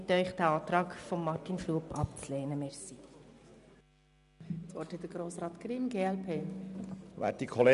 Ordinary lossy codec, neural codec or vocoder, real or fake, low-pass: none; none; real; 10.8 kHz